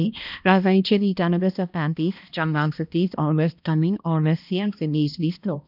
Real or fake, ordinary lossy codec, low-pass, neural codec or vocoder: fake; none; 5.4 kHz; codec, 16 kHz, 1 kbps, X-Codec, HuBERT features, trained on balanced general audio